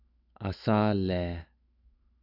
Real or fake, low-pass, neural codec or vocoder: fake; 5.4 kHz; autoencoder, 48 kHz, 128 numbers a frame, DAC-VAE, trained on Japanese speech